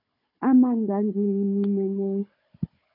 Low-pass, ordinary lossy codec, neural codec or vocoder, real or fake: 5.4 kHz; MP3, 32 kbps; codec, 16 kHz, 16 kbps, FunCodec, trained on Chinese and English, 50 frames a second; fake